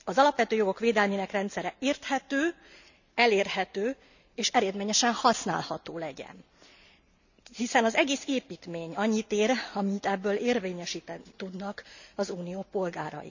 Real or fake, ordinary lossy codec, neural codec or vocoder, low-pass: real; none; none; 7.2 kHz